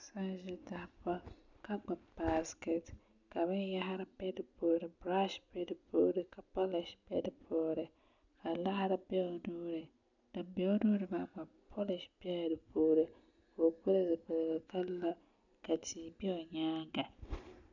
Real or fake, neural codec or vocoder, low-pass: real; none; 7.2 kHz